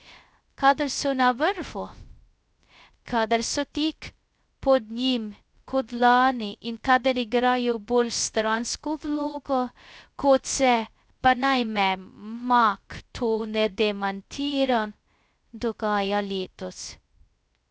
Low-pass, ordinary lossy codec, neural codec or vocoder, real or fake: none; none; codec, 16 kHz, 0.2 kbps, FocalCodec; fake